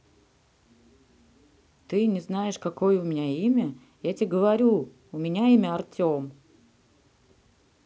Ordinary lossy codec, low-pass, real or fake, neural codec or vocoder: none; none; real; none